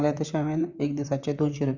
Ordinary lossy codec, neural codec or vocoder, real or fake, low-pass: none; vocoder, 44.1 kHz, 128 mel bands, Pupu-Vocoder; fake; 7.2 kHz